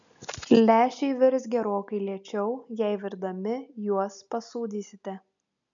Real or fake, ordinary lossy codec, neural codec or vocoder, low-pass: real; MP3, 96 kbps; none; 7.2 kHz